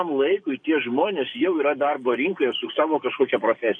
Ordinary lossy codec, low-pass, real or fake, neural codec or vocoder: MP3, 48 kbps; 9.9 kHz; fake; codec, 44.1 kHz, 7.8 kbps, DAC